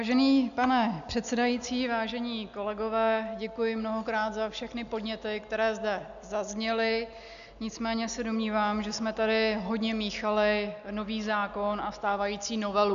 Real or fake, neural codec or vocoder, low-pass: real; none; 7.2 kHz